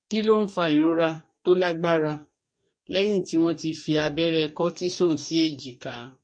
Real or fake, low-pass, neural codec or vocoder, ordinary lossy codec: fake; 9.9 kHz; codec, 44.1 kHz, 2.6 kbps, DAC; MP3, 48 kbps